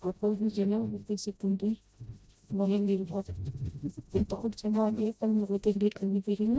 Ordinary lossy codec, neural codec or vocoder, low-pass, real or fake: none; codec, 16 kHz, 0.5 kbps, FreqCodec, smaller model; none; fake